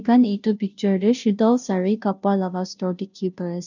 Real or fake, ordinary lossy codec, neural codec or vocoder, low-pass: fake; none; codec, 16 kHz, 0.5 kbps, FunCodec, trained on Chinese and English, 25 frames a second; 7.2 kHz